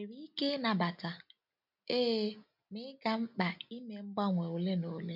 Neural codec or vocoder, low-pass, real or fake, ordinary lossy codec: none; 5.4 kHz; real; MP3, 48 kbps